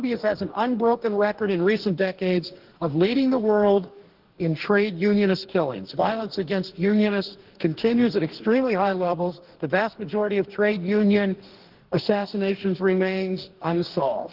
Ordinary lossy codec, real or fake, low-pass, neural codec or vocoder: Opus, 16 kbps; fake; 5.4 kHz; codec, 44.1 kHz, 2.6 kbps, DAC